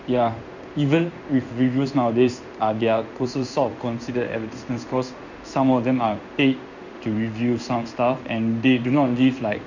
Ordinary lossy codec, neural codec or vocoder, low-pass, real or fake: none; codec, 16 kHz in and 24 kHz out, 1 kbps, XY-Tokenizer; 7.2 kHz; fake